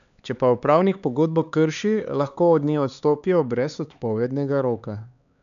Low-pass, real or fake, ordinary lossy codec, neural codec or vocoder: 7.2 kHz; fake; none; codec, 16 kHz, 4 kbps, X-Codec, HuBERT features, trained on LibriSpeech